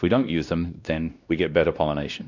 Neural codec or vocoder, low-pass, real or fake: codec, 16 kHz, 1 kbps, X-Codec, WavLM features, trained on Multilingual LibriSpeech; 7.2 kHz; fake